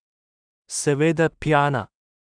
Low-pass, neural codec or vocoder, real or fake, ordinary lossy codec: 9.9 kHz; codec, 16 kHz in and 24 kHz out, 0.4 kbps, LongCat-Audio-Codec, two codebook decoder; fake; none